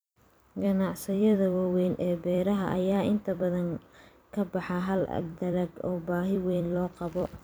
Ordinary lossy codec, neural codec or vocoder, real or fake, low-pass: none; vocoder, 44.1 kHz, 128 mel bands every 256 samples, BigVGAN v2; fake; none